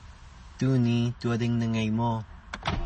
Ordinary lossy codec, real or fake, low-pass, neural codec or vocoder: MP3, 32 kbps; real; 10.8 kHz; none